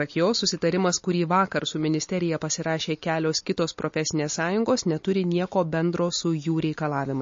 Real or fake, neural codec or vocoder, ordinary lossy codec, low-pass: real; none; MP3, 32 kbps; 7.2 kHz